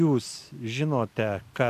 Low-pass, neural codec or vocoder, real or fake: 14.4 kHz; none; real